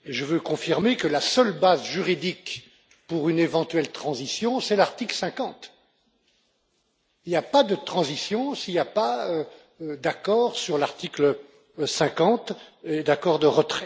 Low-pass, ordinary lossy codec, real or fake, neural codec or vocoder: none; none; real; none